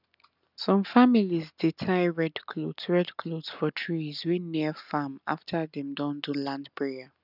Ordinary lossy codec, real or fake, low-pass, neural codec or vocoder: none; real; 5.4 kHz; none